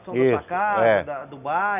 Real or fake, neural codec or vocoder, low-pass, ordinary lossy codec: real; none; 3.6 kHz; none